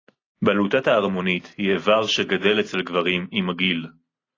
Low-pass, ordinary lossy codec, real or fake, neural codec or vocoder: 7.2 kHz; AAC, 32 kbps; real; none